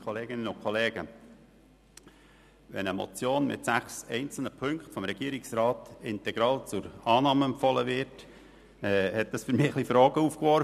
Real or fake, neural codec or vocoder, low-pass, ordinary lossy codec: real; none; 14.4 kHz; none